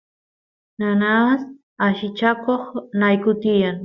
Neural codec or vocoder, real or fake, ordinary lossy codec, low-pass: none; real; Opus, 64 kbps; 7.2 kHz